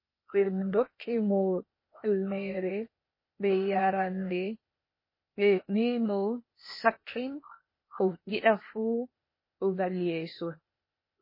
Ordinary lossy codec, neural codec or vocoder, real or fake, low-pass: MP3, 24 kbps; codec, 16 kHz, 0.8 kbps, ZipCodec; fake; 5.4 kHz